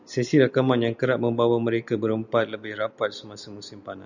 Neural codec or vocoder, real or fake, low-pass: none; real; 7.2 kHz